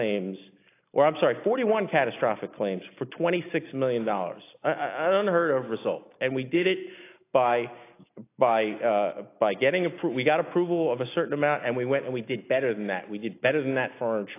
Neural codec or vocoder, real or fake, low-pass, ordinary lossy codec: none; real; 3.6 kHz; AAC, 24 kbps